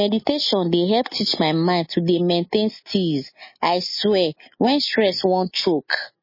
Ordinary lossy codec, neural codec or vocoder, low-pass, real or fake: MP3, 24 kbps; none; 5.4 kHz; real